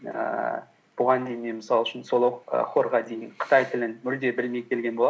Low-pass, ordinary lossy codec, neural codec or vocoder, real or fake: none; none; none; real